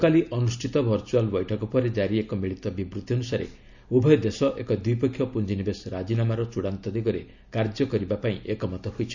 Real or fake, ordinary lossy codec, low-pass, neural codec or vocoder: real; none; 7.2 kHz; none